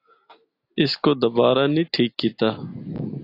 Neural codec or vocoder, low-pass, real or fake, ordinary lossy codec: none; 5.4 kHz; real; AAC, 32 kbps